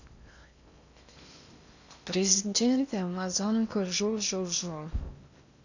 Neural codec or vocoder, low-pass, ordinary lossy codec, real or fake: codec, 16 kHz in and 24 kHz out, 0.6 kbps, FocalCodec, streaming, 4096 codes; 7.2 kHz; none; fake